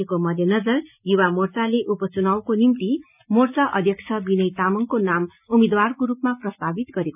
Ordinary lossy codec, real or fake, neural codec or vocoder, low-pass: none; real; none; 3.6 kHz